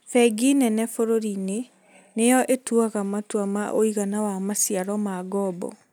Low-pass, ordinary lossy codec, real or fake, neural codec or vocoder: none; none; real; none